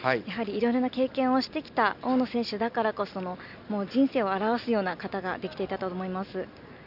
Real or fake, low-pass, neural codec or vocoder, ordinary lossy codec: real; 5.4 kHz; none; none